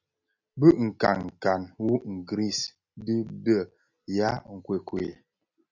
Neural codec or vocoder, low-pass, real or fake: none; 7.2 kHz; real